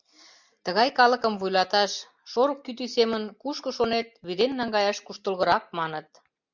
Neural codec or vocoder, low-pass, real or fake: none; 7.2 kHz; real